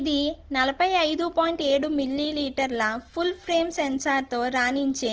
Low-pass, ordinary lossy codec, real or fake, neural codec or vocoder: 7.2 kHz; Opus, 16 kbps; real; none